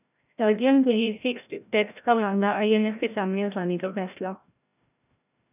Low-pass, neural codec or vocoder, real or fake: 3.6 kHz; codec, 16 kHz, 0.5 kbps, FreqCodec, larger model; fake